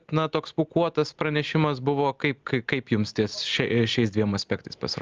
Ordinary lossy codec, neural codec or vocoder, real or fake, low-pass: Opus, 24 kbps; none; real; 7.2 kHz